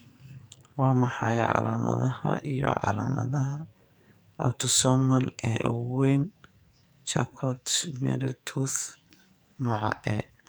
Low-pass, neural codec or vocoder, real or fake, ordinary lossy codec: none; codec, 44.1 kHz, 2.6 kbps, SNAC; fake; none